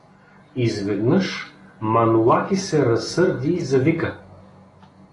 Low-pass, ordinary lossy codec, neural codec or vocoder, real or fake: 10.8 kHz; AAC, 32 kbps; none; real